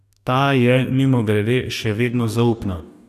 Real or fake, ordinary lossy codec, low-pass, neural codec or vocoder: fake; none; 14.4 kHz; codec, 44.1 kHz, 2.6 kbps, DAC